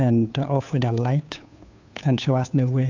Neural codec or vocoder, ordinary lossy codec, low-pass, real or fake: codec, 16 kHz, 2 kbps, FunCodec, trained on Chinese and English, 25 frames a second; MP3, 64 kbps; 7.2 kHz; fake